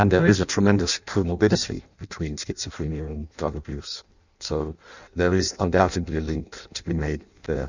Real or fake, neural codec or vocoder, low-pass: fake; codec, 16 kHz in and 24 kHz out, 0.6 kbps, FireRedTTS-2 codec; 7.2 kHz